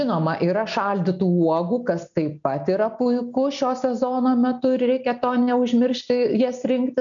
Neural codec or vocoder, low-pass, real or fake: none; 7.2 kHz; real